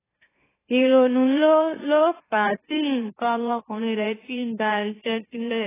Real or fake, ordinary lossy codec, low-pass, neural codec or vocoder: fake; AAC, 16 kbps; 3.6 kHz; autoencoder, 44.1 kHz, a latent of 192 numbers a frame, MeloTTS